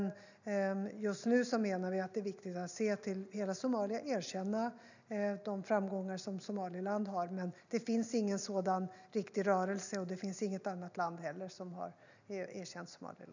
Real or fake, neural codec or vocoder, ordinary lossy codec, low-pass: real; none; none; 7.2 kHz